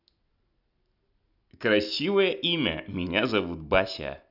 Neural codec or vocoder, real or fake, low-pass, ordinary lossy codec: none; real; 5.4 kHz; none